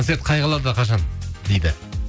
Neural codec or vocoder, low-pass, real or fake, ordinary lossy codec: none; none; real; none